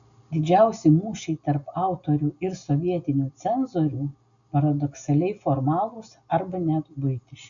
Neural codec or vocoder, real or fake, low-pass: none; real; 7.2 kHz